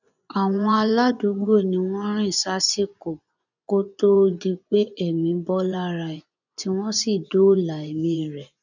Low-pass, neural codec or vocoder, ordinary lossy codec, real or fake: 7.2 kHz; vocoder, 24 kHz, 100 mel bands, Vocos; none; fake